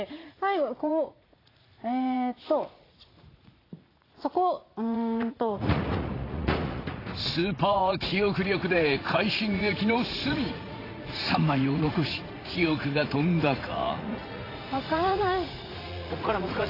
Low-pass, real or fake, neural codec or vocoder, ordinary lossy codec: 5.4 kHz; fake; vocoder, 44.1 kHz, 80 mel bands, Vocos; AAC, 24 kbps